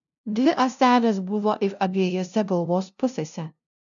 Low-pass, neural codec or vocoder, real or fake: 7.2 kHz; codec, 16 kHz, 0.5 kbps, FunCodec, trained on LibriTTS, 25 frames a second; fake